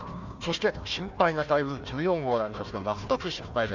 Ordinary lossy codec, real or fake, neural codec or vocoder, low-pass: none; fake; codec, 16 kHz, 1 kbps, FunCodec, trained on Chinese and English, 50 frames a second; 7.2 kHz